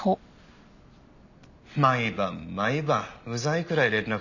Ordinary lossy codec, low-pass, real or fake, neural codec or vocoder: none; 7.2 kHz; real; none